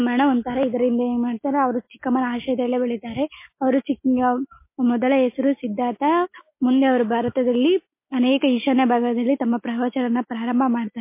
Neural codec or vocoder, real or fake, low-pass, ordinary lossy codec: none; real; 3.6 kHz; MP3, 24 kbps